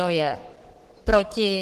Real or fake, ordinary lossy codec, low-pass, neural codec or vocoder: fake; Opus, 16 kbps; 14.4 kHz; codec, 44.1 kHz, 3.4 kbps, Pupu-Codec